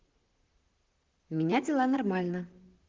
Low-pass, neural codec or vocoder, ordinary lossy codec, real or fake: 7.2 kHz; vocoder, 44.1 kHz, 128 mel bands, Pupu-Vocoder; Opus, 16 kbps; fake